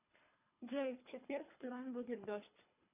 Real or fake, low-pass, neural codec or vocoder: fake; 3.6 kHz; codec, 24 kHz, 3 kbps, HILCodec